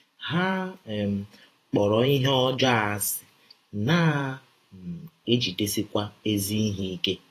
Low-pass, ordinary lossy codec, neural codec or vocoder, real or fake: 14.4 kHz; AAC, 48 kbps; vocoder, 48 kHz, 128 mel bands, Vocos; fake